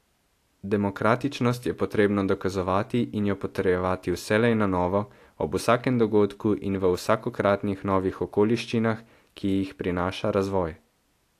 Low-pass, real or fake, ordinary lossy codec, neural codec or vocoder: 14.4 kHz; real; AAC, 64 kbps; none